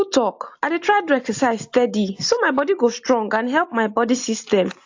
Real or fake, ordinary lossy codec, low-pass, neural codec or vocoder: real; AAC, 48 kbps; 7.2 kHz; none